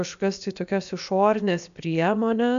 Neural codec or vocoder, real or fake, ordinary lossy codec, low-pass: codec, 16 kHz, about 1 kbps, DyCAST, with the encoder's durations; fake; AAC, 96 kbps; 7.2 kHz